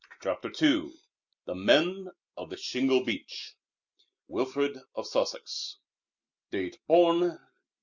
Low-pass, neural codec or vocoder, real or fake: 7.2 kHz; none; real